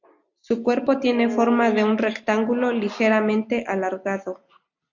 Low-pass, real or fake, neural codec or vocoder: 7.2 kHz; real; none